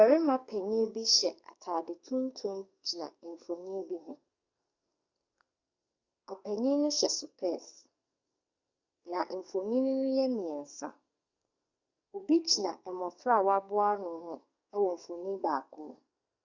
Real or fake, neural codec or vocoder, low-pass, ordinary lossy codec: fake; codec, 44.1 kHz, 2.6 kbps, SNAC; 7.2 kHz; Opus, 64 kbps